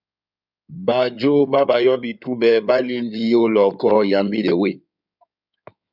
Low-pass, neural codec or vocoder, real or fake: 5.4 kHz; codec, 16 kHz in and 24 kHz out, 2.2 kbps, FireRedTTS-2 codec; fake